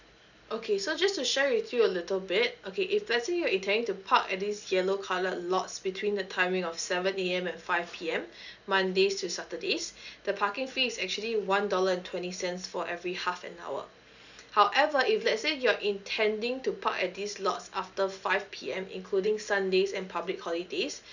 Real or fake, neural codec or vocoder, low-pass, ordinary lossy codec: real; none; 7.2 kHz; none